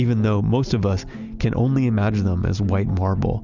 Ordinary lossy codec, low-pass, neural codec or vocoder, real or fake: Opus, 64 kbps; 7.2 kHz; none; real